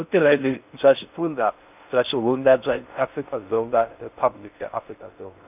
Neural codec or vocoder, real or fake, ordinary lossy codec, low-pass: codec, 16 kHz in and 24 kHz out, 0.6 kbps, FocalCodec, streaming, 4096 codes; fake; none; 3.6 kHz